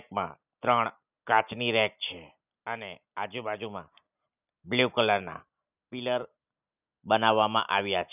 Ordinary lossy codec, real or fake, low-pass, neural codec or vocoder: none; real; 3.6 kHz; none